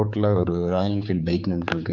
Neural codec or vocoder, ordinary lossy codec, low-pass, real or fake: codec, 16 kHz, 4 kbps, X-Codec, HuBERT features, trained on general audio; none; 7.2 kHz; fake